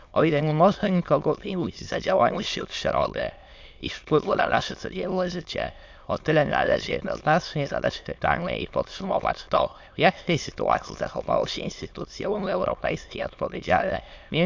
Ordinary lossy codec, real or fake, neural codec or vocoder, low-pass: MP3, 64 kbps; fake; autoencoder, 22.05 kHz, a latent of 192 numbers a frame, VITS, trained on many speakers; 7.2 kHz